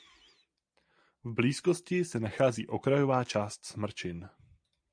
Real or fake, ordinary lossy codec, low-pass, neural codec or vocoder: real; MP3, 64 kbps; 9.9 kHz; none